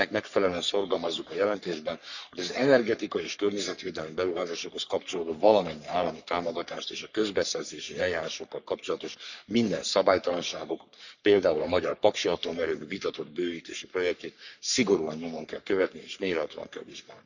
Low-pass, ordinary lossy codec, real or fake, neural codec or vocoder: 7.2 kHz; none; fake; codec, 44.1 kHz, 3.4 kbps, Pupu-Codec